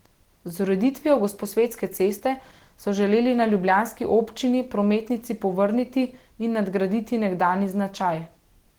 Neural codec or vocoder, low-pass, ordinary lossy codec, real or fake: none; 19.8 kHz; Opus, 16 kbps; real